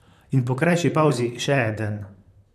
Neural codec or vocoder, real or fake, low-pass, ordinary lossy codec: vocoder, 44.1 kHz, 128 mel bands, Pupu-Vocoder; fake; 14.4 kHz; none